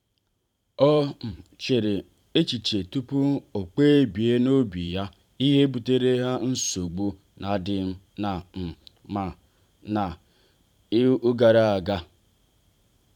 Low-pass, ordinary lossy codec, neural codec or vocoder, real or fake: 19.8 kHz; none; vocoder, 44.1 kHz, 128 mel bands every 512 samples, BigVGAN v2; fake